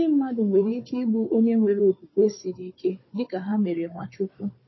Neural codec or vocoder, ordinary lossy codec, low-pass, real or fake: codec, 16 kHz, 4 kbps, FunCodec, trained on Chinese and English, 50 frames a second; MP3, 24 kbps; 7.2 kHz; fake